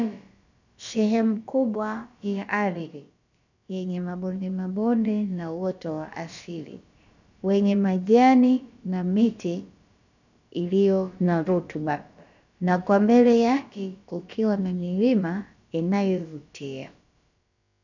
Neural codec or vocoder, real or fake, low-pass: codec, 16 kHz, about 1 kbps, DyCAST, with the encoder's durations; fake; 7.2 kHz